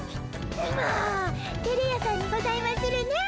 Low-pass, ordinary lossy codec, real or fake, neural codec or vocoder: none; none; real; none